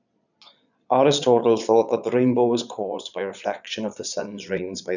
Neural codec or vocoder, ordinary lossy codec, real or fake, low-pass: vocoder, 22.05 kHz, 80 mel bands, Vocos; none; fake; 7.2 kHz